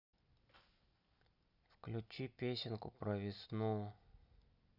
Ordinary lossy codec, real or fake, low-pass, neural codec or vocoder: none; real; 5.4 kHz; none